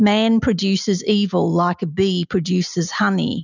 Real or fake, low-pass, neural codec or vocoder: real; 7.2 kHz; none